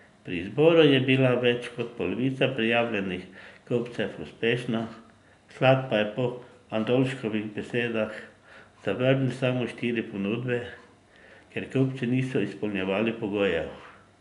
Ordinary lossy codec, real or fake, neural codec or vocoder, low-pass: none; real; none; 10.8 kHz